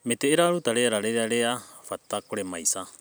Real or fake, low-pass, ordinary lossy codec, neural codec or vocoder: fake; none; none; vocoder, 44.1 kHz, 128 mel bands every 256 samples, BigVGAN v2